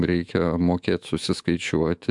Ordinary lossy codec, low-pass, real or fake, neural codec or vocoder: MP3, 64 kbps; 10.8 kHz; fake; autoencoder, 48 kHz, 128 numbers a frame, DAC-VAE, trained on Japanese speech